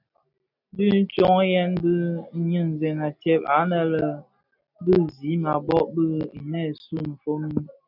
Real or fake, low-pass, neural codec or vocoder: real; 5.4 kHz; none